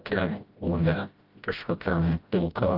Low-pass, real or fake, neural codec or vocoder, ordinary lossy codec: 5.4 kHz; fake; codec, 16 kHz, 0.5 kbps, FreqCodec, smaller model; Opus, 32 kbps